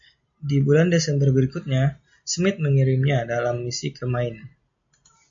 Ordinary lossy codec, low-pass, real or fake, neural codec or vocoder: MP3, 48 kbps; 7.2 kHz; real; none